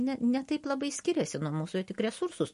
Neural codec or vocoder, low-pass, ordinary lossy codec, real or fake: none; 14.4 kHz; MP3, 48 kbps; real